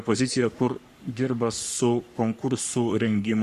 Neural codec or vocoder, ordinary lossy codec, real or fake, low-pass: codec, 44.1 kHz, 3.4 kbps, Pupu-Codec; Opus, 64 kbps; fake; 14.4 kHz